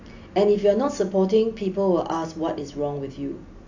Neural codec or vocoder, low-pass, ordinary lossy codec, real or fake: none; 7.2 kHz; AAC, 48 kbps; real